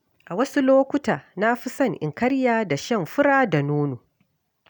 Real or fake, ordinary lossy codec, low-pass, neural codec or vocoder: real; none; none; none